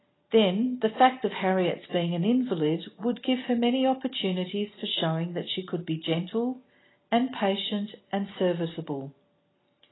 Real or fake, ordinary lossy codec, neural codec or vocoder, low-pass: real; AAC, 16 kbps; none; 7.2 kHz